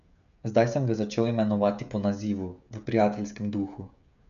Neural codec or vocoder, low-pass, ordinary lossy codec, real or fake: codec, 16 kHz, 16 kbps, FreqCodec, smaller model; 7.2 kHz; none; fake